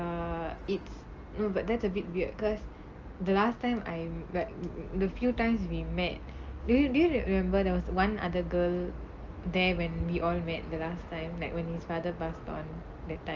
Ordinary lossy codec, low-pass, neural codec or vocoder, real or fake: Opus, 24 kbps; 7.2 kHz; none; real